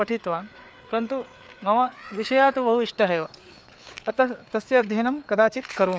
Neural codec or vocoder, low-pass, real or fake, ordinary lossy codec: codec, 16 kHz, 4 kbps, FreqCodec, larger model; none; fake; none